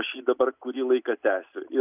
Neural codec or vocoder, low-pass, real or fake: none; 3.6 kHz; real